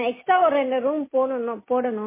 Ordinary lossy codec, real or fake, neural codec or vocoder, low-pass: MP3, 16 kbps; real; none; 3.6 kHz